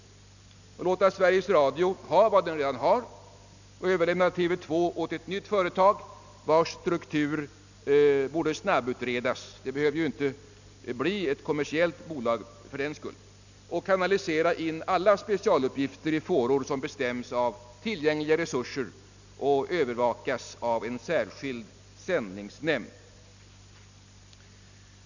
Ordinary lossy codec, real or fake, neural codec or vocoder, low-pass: none; real; none; 7.2 kHz